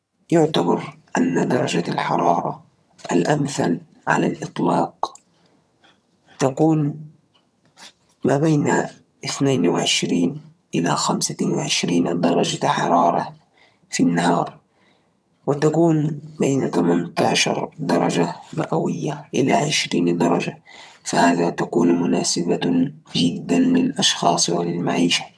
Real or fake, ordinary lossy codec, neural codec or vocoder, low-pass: fake; none; vocoder, 22.05 kHz, 80 mel bands, HiFi-GAN; none